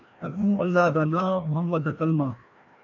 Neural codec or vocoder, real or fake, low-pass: codec, 16 kHz, 1 kbps, FreqCodec, larger model; fake; 7.2 kHz